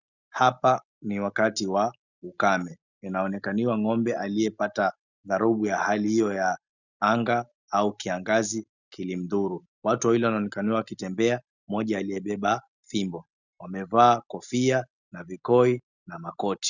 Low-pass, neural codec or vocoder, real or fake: 7.2 kHz; none; real